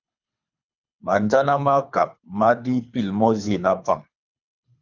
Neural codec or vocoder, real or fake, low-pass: codec, 24 kHz, 3 kbps, HILCodec; fake; 7.2 kHz